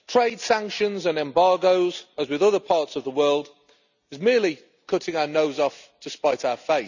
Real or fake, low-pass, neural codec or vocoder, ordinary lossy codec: real; 7.2 kHz; none; none